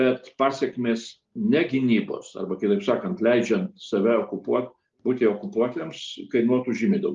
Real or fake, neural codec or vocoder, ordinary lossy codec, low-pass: real; none; Opus, 32 kbps; 7.2 kHz